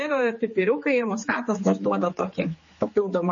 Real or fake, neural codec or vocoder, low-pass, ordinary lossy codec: fake; codec, 16 kHz, 4 kbps, X-Codec, HuBERT features, trained on general audio; 7.2 kHz; MP3, 32 kbps